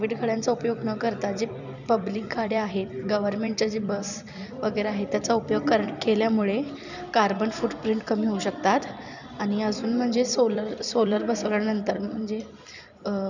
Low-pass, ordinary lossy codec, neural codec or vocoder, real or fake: 7.2 kHz; none; vocoder, 44.1 kHz, 128 mel bands every 256 samples, BigVGAN v2; fake